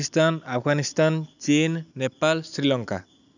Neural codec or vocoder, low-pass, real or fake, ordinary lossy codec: none; 7.2 kHz; real; none